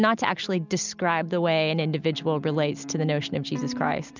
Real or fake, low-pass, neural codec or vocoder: real; 7.2 kHz; none